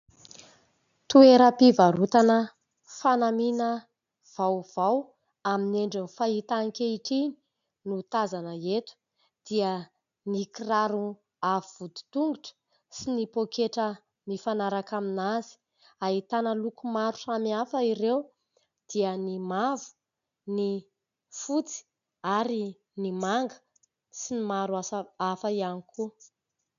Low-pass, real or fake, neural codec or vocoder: 7.2 kHz; real; none